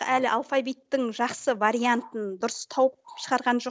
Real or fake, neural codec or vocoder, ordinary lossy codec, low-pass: real; none; none; none